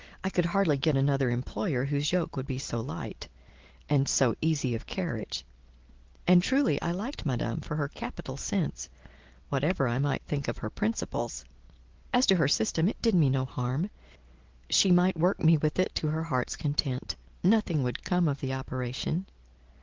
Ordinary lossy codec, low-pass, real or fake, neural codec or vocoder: Opus, 16 kbps; 7.2 kHz; real; none